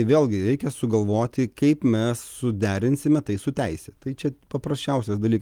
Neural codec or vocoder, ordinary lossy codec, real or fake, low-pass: none; Opus, 24 kbps; real; 19.8 kHz